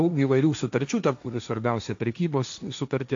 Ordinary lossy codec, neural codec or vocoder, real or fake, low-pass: AAC, 64 kbps; codec, 16 kHz, 1.1 kbps, Voila-Tokenizer; fake; 7.2 kHz